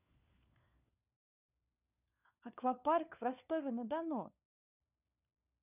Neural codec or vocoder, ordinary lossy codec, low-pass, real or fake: codec, 16 kHz, 4 kbps, FunCodec, trained on LibriTTS, 50 frames a second; none; 3.6 kHz; fake